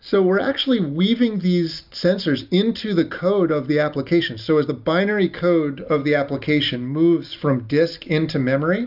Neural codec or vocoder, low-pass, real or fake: none; 5.4 kHz; real